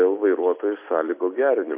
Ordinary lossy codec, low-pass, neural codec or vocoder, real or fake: MP3, 24 kbps; 3.6 kHz; none; real